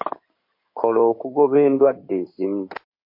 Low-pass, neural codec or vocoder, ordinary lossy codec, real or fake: 5.4 kHz; codec, 16 kHz in and 24 kHz out, 2.2 kbps, FireRedTTS-2 codec; MP3, 32 kbps; fake